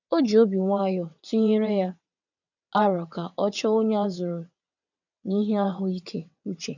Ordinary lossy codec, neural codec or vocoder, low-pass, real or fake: none; vocoder, 22.05 kHz, 80 mel bands, WaveNeXt; 7.2 kHz; fake